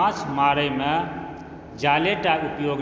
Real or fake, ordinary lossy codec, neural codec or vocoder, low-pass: real; none; none; none